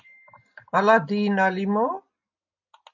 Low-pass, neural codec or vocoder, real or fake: 7.2 kHz; none; real